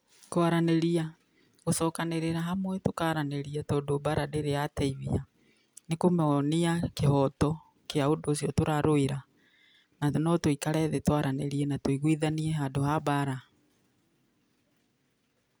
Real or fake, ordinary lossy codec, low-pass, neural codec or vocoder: real; none; none; none